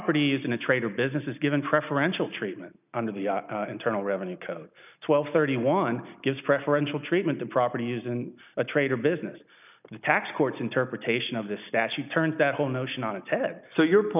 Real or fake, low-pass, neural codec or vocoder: real; 3.6 kHz; none